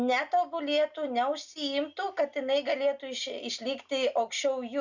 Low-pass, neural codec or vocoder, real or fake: 7.2 kHz; none; real